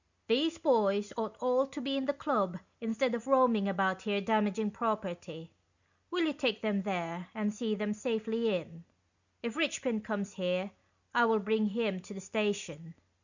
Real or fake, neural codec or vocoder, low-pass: real; none; 7.2 kHz